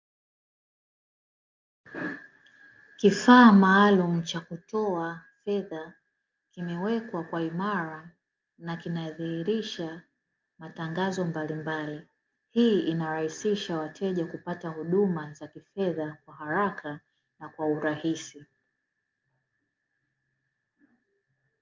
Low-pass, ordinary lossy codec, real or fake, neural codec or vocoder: 7.2 kHz; Opus, 24 kbps; real; none